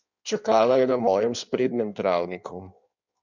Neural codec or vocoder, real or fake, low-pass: codec, 16 kHz in and 24 kHz out, 1.1 kbps, FireRedTTS-2 codec; fake; 7.2 kHz